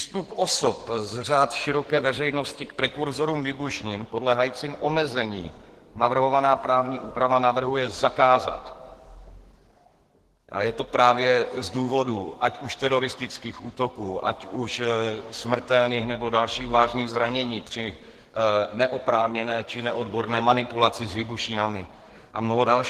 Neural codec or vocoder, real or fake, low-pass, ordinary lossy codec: codec, 44.1 kHz, 2.6 kbps, SNAC; fake; 14.4 kHz; Opus, 16 kbps